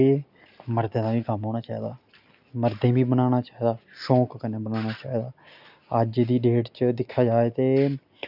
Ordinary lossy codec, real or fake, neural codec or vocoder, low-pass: none; real; none; 5.4 kHz